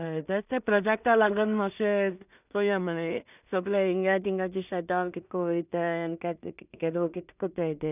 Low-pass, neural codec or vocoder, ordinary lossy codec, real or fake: 3.6 kHz; codec, 16 kHz in and 24 kHz out, 0.4 kbps, LongCat-Audio-Codec, two codebook decoder; none; fake